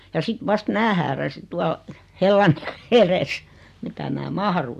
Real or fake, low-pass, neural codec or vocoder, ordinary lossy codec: real; 14.4 kHz; none; AAC, 96 kbps